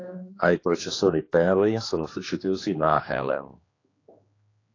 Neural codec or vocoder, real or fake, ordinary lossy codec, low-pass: codec, 16 kHz, 2 kbps, X-Codec, HuBERT features, trained on general audio; fake; AAC, 32 kbps; 7.2 kHz